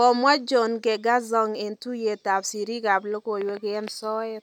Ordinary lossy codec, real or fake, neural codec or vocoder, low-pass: none; real; none; 19.8 kHz